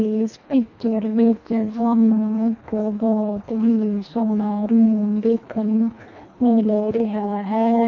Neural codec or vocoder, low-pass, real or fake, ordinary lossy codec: codec, 24 kHz, 1.5 kbps, HILCodec; 7.2 kHz; fake; none